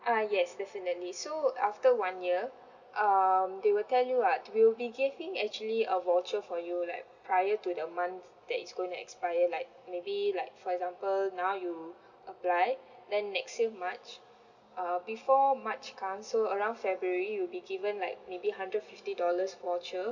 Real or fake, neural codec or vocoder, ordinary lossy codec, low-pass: real; none; none; 7.2 kHz